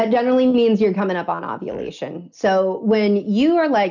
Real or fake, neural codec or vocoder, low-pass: real; none; 7.2 kHz